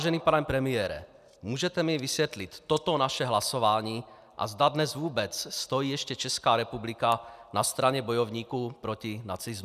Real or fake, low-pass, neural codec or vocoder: real; 14.4 kHz; none